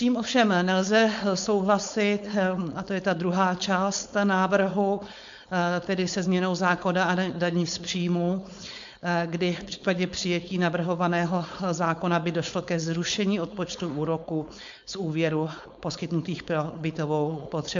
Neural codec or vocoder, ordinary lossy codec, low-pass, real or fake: codec, 16 kHz, 4.8 kbps, FACodec; MP3, 64 kbps; 7.2 kHz; fake